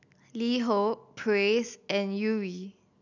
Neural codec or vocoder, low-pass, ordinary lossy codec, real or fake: none; 7.2 kHz; none; real